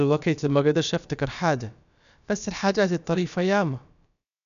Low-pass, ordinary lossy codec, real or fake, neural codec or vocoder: 7.2 kHz; none; fake; codec, 16 kHz, about 1 kbps, DyCAST, with the encoder's durations